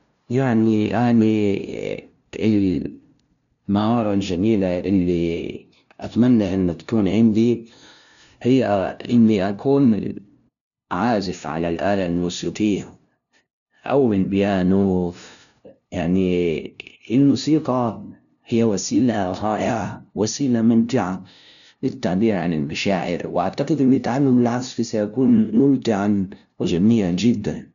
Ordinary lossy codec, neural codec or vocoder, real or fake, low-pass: none; codec, 16 kHz, 0.5 kbps, FunCodec, trained on LibriTTS, 25 frames a second; fake; 7.2 kHz